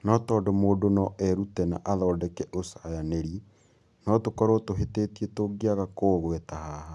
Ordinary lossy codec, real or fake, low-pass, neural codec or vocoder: none; real; none; none